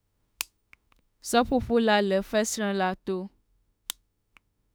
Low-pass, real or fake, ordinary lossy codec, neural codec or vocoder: none; fake; none; autoencoder, 48 kHz, 32 numbers a frame, DAC-VAE, trained on Japanese speech